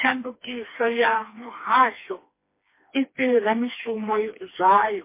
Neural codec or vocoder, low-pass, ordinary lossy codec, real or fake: codec, 16 kHz, 2 kbps, FreqCodec, smaller model; 3.6 kHz; MP3, 24 kbps; fake